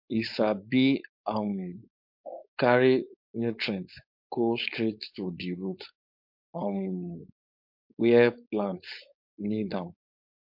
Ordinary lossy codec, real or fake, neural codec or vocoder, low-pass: MP3, 48 kbps; fake; codec, 16 kHz, 4.8 kbps, FACodec; 5.4 kHz